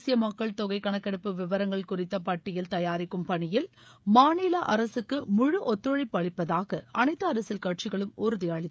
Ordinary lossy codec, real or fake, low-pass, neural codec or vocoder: none; fake; none; codec, 16 kHz, 16 kbps, FreqCodec, smaller model